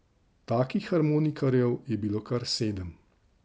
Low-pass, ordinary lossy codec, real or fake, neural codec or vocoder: none; none; real; none